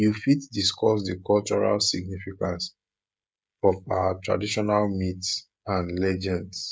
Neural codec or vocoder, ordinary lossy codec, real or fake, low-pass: codec, 16 kHz, 16 kbps, FreqCodec, smaller model; none; fake; none